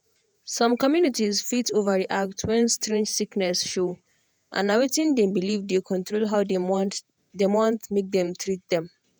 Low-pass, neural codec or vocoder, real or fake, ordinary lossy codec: none; vocoder, 48 kHz, 128 mel bands, Vocos; fake; none